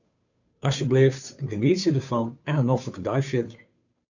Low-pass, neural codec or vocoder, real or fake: 7.2 kHz; codec, 16 kHz, 2 kbps, FunCodec, trained on Chinese and English, 25 frames a second; fake